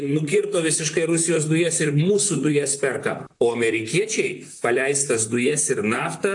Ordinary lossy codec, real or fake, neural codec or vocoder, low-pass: AAC, 64 kbps; fake; vocoder, 44.1 kHz, 128 mel bands, Pupu-Vocoder; 10.8 kHz